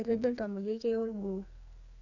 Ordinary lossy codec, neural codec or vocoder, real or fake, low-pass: none; codec, 44.1 kHz, 2.6 kbps, SNAC; fake; 7.2 kHz